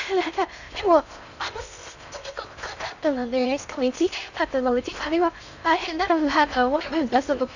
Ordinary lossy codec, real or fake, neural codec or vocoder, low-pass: none; fake; codec, 16 kHz in and 24 kHz out, 0.6 kbps, FocalCodec, streaming, 4096 codes; 7.2 kHz